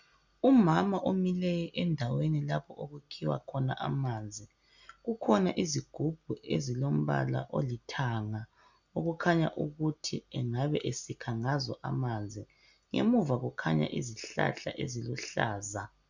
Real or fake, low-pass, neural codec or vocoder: real; 7.2 kHz; none